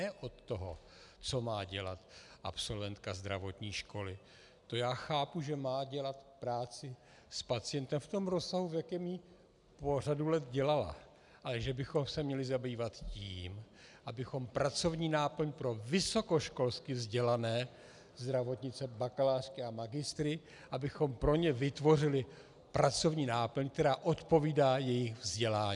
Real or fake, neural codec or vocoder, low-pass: real; none; 10.8 kHz